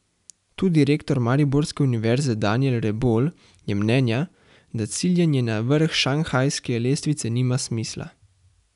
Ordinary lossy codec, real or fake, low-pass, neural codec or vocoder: none; real; 10.8 kHz; none